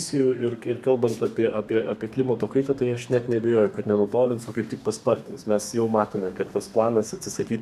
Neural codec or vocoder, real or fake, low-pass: codec, 32 kHz, 1.9 kbps, SNAC; fake; 14.4 kHz